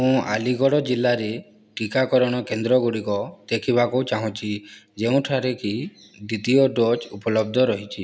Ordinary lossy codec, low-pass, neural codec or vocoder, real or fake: none; none; none; real